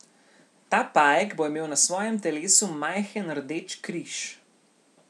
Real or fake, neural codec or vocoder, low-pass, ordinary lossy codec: real; none; none; none